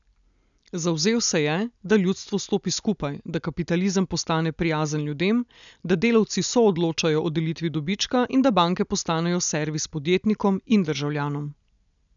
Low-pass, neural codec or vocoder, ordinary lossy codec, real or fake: 7.2 kHz; none; none; real